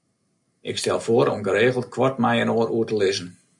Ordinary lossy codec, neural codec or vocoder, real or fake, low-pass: MP3, 96 kbps; none; real; 10.8 kHz